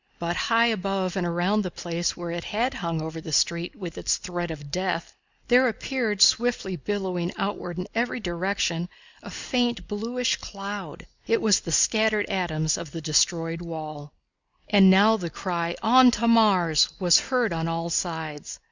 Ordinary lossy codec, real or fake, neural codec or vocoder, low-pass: Opus, 64 kbps; real; none; 7.2 kHz